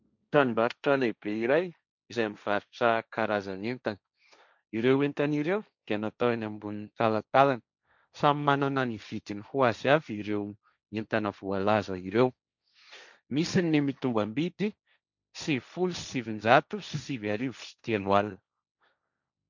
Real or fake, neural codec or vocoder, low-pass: fake; codec, 16 kHz, 1.1 kbps, Voila-Tokenizer; 7.2 kHz